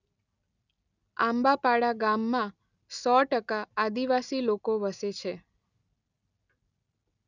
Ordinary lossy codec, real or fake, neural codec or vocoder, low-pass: none; real; none; 7.2 kHz